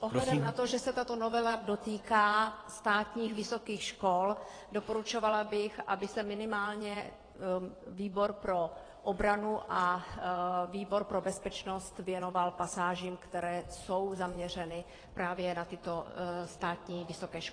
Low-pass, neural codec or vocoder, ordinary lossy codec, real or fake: 9.9 kHz; vocoder, 22.05 kHz, 80 mel bands, WaveNeXt; AAC, 32 kbps; fake